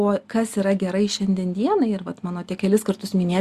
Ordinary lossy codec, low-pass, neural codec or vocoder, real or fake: Opus, 64 kbps; 14.4 kHz; none; real